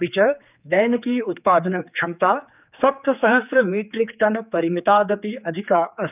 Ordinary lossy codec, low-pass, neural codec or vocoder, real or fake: none; 3.6 kHz; codec, 16 kHz, 4 kbps, X-Codec, HuBERT features, trained on general audio; fake